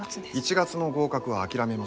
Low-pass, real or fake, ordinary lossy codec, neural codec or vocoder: none; real; none; none